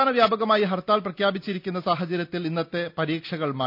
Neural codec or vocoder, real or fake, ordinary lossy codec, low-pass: none; real; none; 5.4 kHz